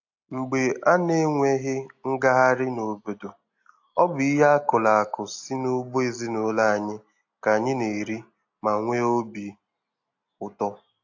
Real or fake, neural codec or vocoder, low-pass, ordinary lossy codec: real; none; 7.2 kHz; AAC, 48 kbps